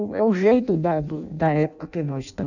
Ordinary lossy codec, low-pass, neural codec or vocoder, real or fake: none; 7.2 kHz; codec, 16 kHz in and 24 kHz out, 0.6 kbps, FireRedTTS-2 codec; fake